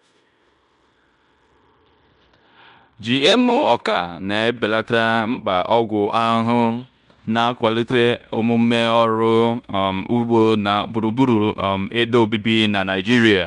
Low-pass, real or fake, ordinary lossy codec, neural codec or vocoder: 10.8 kHz; fake; none; codec, 16 kHz in and 24 kHz out, 0.9 kbps, LongCat-Audio-Codec, fine tuned four codebook decoder